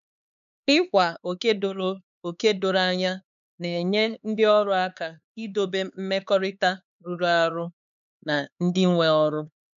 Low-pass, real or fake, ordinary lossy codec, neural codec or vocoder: 7.2 kHz; fake; none; codec, 16 kHz, 4 kbps, X-Codec, HuBERT features, trained on LibriSpeech